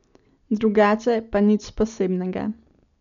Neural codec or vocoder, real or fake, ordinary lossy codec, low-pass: none; real; none; 7.2 kHz